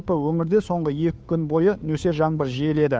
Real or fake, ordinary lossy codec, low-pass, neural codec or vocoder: fake; none; none; codec, 16 kHz, 2 kbps, FunCodec, trained on Chinese and English, 25 frames a second